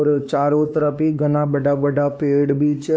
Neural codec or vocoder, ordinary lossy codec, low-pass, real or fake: codec, 16 kHz, 2 kbps, X-Codec, WavLM features, trained on Multilingual LibriSpeech; none; none; fake